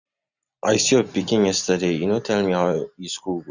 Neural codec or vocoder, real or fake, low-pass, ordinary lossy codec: none; real; 7.2 kHz; none